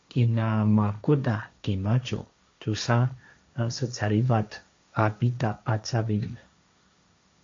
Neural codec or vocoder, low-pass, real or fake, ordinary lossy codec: codec, 16 kHz, 1.1 kbps, Voila-Tokenizer; 7.2 kHz; fake; MP3, 48 kbps